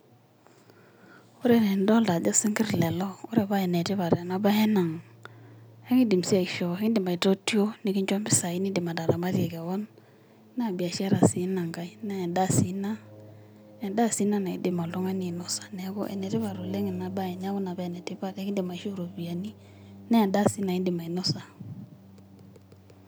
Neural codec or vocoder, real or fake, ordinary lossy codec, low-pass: none; real; none; none